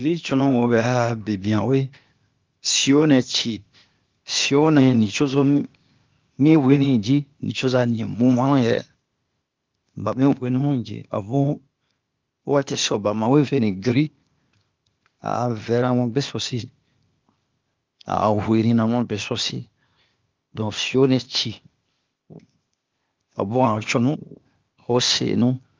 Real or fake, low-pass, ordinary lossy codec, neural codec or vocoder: fake; 7.2 kHz; Opus, 24 kbps; codec, 16 kHz, 0.8 kbps, ZipCodec